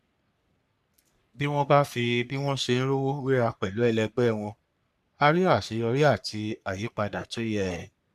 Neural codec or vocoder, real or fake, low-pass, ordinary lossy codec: codec, 44.1 kHz, 3.4 kbps, Pupu-Codec; fake; 14.4 kHz; none